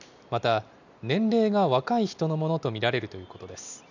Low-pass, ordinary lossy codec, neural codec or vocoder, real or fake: 7.2 kHz; none; none; real